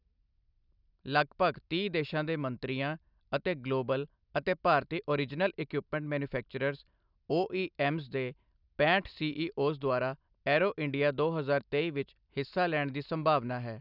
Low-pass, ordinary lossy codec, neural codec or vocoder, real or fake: 5.4 kHz; none; none; real